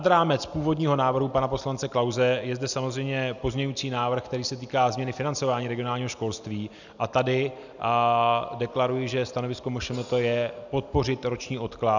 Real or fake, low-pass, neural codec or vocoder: real; 7.2 kHz; none